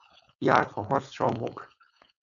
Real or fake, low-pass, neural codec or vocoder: fake; 7.2 kHz; codec, 16 kHz, 4.8 kbps, FACodec